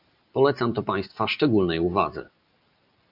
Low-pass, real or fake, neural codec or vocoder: 5.4 kHz; real; none